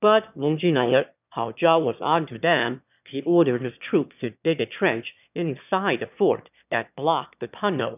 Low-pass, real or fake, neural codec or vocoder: 3.6 kHz; fake; autoencoder, 22.05 kHz, a latent of 192 numbers a frame, VITS, trained on one speaker